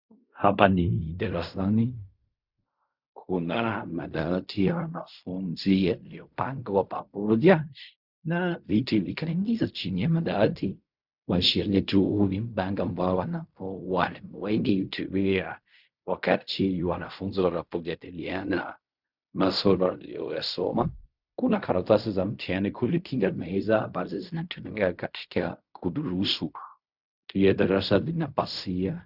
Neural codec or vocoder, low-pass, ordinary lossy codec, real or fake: codec, 16 kHz in and 24 kHz out, 0.4 kbps, LongCat-Audio-Codec, fine tuned four codebook decoder; 5.4 kHz; Opus, 64 kbps; fake